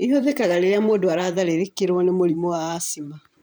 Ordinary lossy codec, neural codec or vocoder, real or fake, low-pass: none; vocoder, 44.1 kHz, 128 mel bands every 512 samples, BigVGAN v2; fake; none